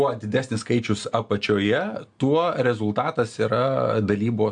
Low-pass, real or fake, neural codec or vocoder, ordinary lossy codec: 9.9 kHz; real; none; AAC, 64 kbps